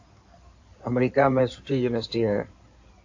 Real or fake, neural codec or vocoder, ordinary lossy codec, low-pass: fake; codec, 16 kHz in and 24 kHz out, 2.2 kbps, FireRedTTS-2 codec; AAC, 48 kbps; 7.2 kHz